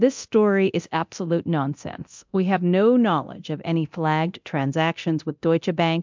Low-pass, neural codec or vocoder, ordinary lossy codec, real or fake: 7.2 kHz; codec, 24 kHz, 0.9 kbps, DualCodec; MP3, 64 kbps; fake